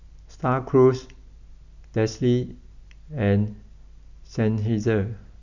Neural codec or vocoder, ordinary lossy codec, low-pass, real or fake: none; none; 7.2 kHz; real